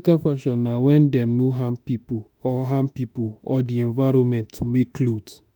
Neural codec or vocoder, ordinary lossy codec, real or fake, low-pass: autoencoder, 48 kHz, 32 numbers a frame, DAC-VAE, trained on Japanese speech; none; fake; 19.8 kHz